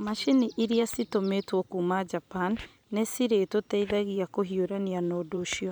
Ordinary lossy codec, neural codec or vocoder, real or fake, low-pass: none; vocoder, 44.1 kHz, 128 mel bands every 512 samples, BigVGAN v2; fake; none